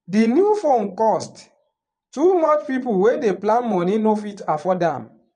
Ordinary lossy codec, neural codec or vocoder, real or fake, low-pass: none; vocoder, 22.05 kHz, 80 mel bands, Vocos; fake; 9.9 kHz